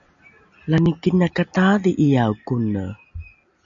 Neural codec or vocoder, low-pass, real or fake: none; 7.2 kHz; real